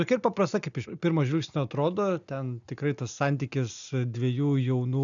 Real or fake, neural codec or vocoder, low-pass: real; none; 7.2 kHz